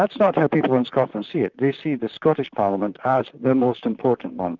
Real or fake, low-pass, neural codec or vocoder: fake; 7.2 kHz; vocoder, 44.1 kHz, 128 mel bands, Pupu-Vocoder